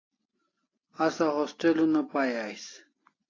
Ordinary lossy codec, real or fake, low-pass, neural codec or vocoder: AAC, 32 kbps; real; 7.2 kHz; none